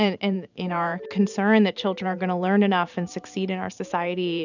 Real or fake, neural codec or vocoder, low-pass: fake; vocoder, 44.1 kHz, 128 mel bands every 512 samples, BigVGAN v2; 7.2 kHz